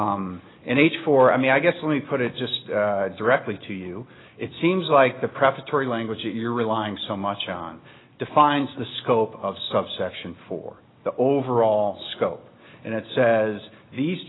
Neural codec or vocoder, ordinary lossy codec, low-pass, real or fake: none; AAC, 16 kbps; 7.2 kHz; real